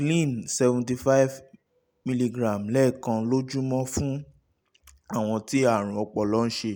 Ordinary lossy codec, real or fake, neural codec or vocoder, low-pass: none; real; none; none